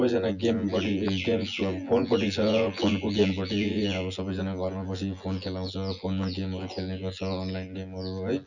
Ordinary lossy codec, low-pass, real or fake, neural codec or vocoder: none; 7.2 kHz; fake; vocoder, 24 kHz, 100 mel bands, Vocos